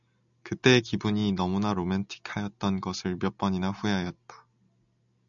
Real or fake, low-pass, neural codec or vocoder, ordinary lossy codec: real; 7.2 kHz; none; MP3, 96 kbps